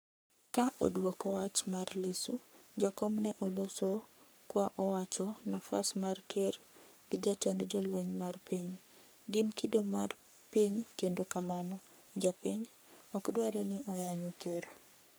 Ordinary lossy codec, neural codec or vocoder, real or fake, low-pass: none; codec, 44.1 kHz, 3.4 kbps, Pupu-Codec; fake; none